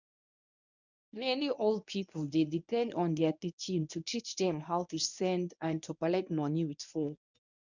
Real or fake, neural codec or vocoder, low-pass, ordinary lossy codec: fake; codec, 24 kHz, 0.9 kbps, WavTokenizer, medium speech release version 1; 7.2 kHz; none